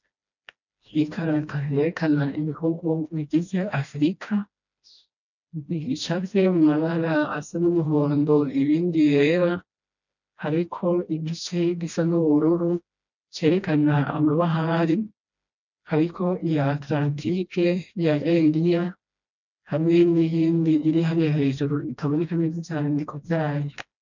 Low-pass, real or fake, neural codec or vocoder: 7.2 kHz; fake; codec, 16 kHz, 1 kbps, FreqCodec, smaller model